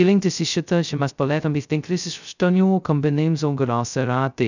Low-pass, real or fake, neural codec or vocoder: 7.2 kHz; fake; codec, 16 kHz, 0.2 kbps, FocalCodec